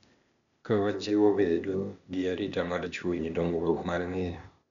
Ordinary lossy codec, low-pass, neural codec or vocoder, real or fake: none; 7.2 kHz; codec, 16 kHz, 0.8 kbps, ZipCodec; fake